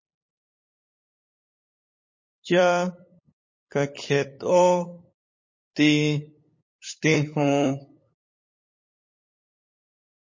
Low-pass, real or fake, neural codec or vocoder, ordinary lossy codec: 7.2 kHz; fake; codec, 16 kHz, 8 kbps, FunCodec, trained on LibriTTS, 25 frames a second; MP3, 32 kbps